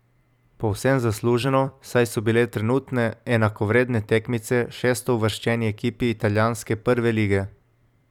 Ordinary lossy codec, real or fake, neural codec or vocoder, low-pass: none; fake; vocoder, 44.1 kHz, 128 mel bands every 256 samples, BigVGAN v2; 19.8 kHz